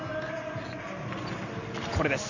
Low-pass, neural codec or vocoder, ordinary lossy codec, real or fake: 7.2 kHz; none; none; real